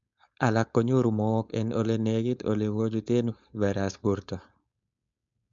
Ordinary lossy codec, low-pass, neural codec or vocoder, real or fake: MP3, 64 kbps; 7.2 kHz; codec, 16 kHz, 4.8 kbps, FACodec; fake